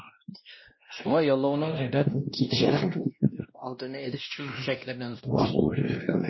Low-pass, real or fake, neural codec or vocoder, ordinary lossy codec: 7.2 kHz; fake; codec, 16 kHz, 1 kbps, X-Codec, WavLM features, trained on Multilingual LibriSpeech; MP3, 24 kbps